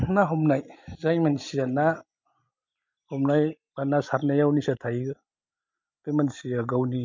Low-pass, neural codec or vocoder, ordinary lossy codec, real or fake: 7.2 kHz; none; MP3, 64 kbps; real